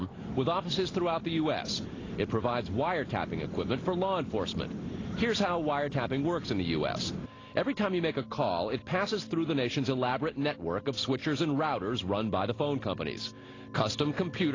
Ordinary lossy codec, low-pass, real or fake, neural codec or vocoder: AAC, 32 kbps; 7.2 kHz; real; none